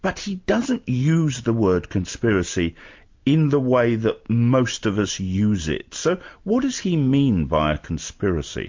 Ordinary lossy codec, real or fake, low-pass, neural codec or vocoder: MP3, 48 kbps; real; 7.2 kHz; none